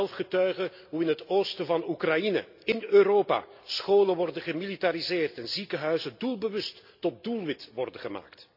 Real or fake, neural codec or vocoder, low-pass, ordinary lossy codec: real; none; 5.4 kHz; AAC, 48 kbps